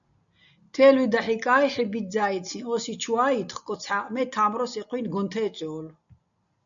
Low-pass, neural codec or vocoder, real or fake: 7.2 kHz; none; real